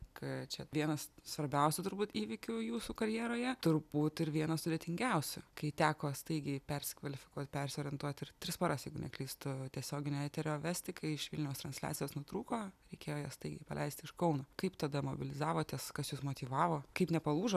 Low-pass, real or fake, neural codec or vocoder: 14.4 kHz; real; none